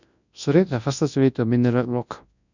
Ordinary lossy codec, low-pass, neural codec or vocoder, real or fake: none; 7.2 kHz; codec, 24 kHz, 0.9 kbps, WavTokenizer, large speech release; fake